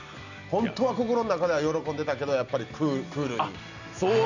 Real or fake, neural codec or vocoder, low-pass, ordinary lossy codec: real; none; 7.2 kHz; none